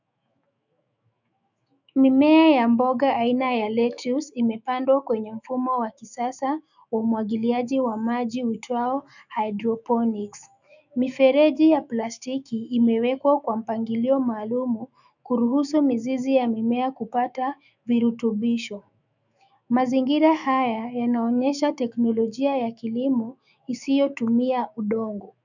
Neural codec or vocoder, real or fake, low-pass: autoencoder, 48 kHz, 128 numbers a frame, DAC-VAE, trained on Japanese speech; fake; 7.2 kHz